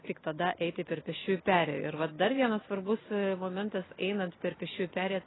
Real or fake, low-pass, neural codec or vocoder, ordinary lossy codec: real; 7.2 kHz; none; AAC, 16 kbps